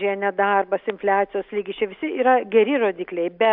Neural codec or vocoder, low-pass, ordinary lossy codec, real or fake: none; 5.4 kHz; AAC, 48 kbps; real